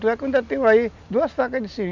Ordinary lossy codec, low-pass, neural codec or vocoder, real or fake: none; 7.2 kHz; none; real